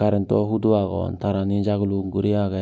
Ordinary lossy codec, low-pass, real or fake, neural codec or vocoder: none; none; real; none